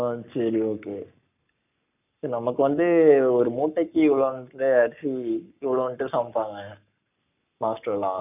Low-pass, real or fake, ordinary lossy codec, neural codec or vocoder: 3.6 kHz; fake; none; codec, 44.1 kHz, 7.8 kbps, Pupu-Codec